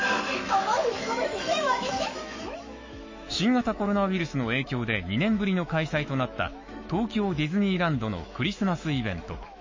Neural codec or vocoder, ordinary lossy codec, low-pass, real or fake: autoencoder, 48 kHz, 128 numbers a frame, DAC-VAE, trained on Japanese speech; MP3, 32 kbps; 7.2 kHz; fake